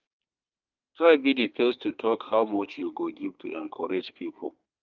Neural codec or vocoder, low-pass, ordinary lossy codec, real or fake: codec, 32 kHz, 1.9 kbps, SNAC; 7.2 kHz; Opus, 24 kbps; fake